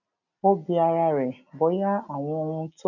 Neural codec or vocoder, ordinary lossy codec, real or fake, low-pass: none; none; real; 7.2 kHz